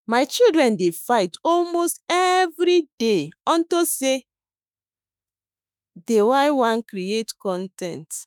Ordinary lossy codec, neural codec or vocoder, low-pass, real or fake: none; autoencoder, 48 kHz, 32 numbers a frame, DAC-VAE, trained on Japanese speech; none; fake